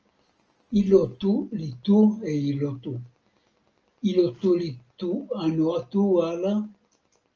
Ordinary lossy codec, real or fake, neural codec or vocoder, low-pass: Opus, 24 kbps; real; none; 7.2 kHz